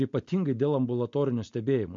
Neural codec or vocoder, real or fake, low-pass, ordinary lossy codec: none; real; 7.2 kHz; MP3, 48 kbps